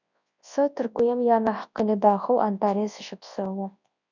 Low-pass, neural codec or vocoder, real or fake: 7.2 kHz; codec, 24 kHz, 0.9 kbps, WavTokenizer, large speech release; fake